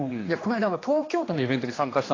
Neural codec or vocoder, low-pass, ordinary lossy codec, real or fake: codec, 16 kHz, 2 kbps, X-Codec, HuBERT features, trained on general audio; 7.2 kHz; AAC, 32 kbps; fake